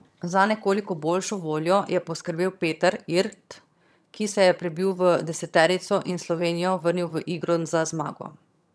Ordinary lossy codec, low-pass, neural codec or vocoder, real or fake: none; none; vocoder, 22.05 kHz, 80 mel bands, HiFi-GAN; fake